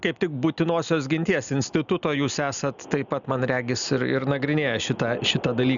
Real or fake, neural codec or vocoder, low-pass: real; none; 7.2 kHz